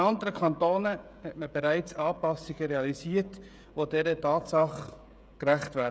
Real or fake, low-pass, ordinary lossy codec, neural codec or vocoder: fake; none; none; codec, 16 kHz, 16 kbps, FreqCodec, smaller model